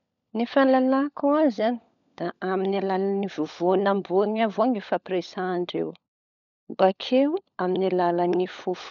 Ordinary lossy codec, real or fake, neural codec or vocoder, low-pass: none; fake; codec, 16 kHz, 16 kbps, FunCodec, trained on LibriTTS, 50 frames a second; 7.2 kHz